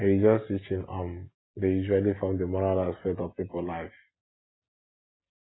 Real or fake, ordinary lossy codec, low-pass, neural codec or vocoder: real; AAC, 16 kbps; 7.2 kHz; none